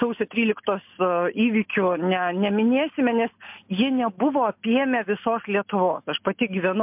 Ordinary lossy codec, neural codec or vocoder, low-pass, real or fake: MP3, 32 kbps; none; 3.6 kHz; real